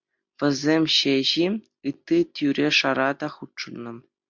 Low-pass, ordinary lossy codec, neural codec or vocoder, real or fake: 7.2 kHz; MP3, 64 kbps; none; real